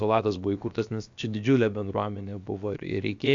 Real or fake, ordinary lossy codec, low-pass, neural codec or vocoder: fake; AAC, 64 kbps; 7.2 kHz; codec, 16 kHz, about 1 kbps, DyCAST, with the encoder's durations